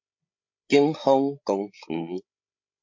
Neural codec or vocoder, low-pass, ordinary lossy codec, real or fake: codec, 16 kHz, 16 kbps, FreqCodec, larger model; 7.2 kHz; MP3, 48 kbps; fake